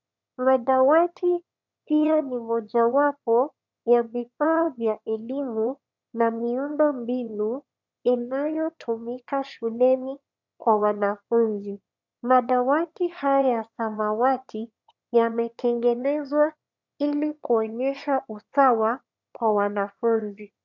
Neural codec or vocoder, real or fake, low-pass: autoencoder, 22.05 kHz, a latent of 192 numbers a frame, VITS, trained on one speaker; fake; 7.2 kHz